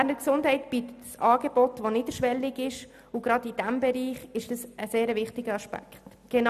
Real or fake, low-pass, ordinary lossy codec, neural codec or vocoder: real; 14.4 kHz; none; none